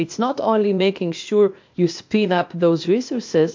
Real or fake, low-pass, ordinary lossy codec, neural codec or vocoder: fake; 7.2 kHz; MP3, 48 kbps; codec, 16 kHz, 0.8 kbps, ZipCodec